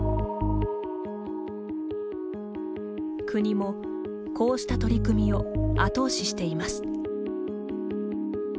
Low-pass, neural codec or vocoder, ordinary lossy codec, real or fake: none; none; none; real